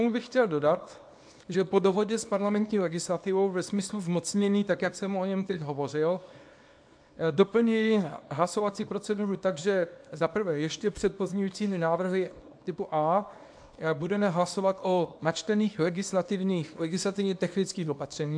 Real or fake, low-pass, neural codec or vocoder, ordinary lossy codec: fake; 9.9 kHz; codec, 24 kHz, 0.9 kbps, WavTokenizer, small release; AAC, 64 kbps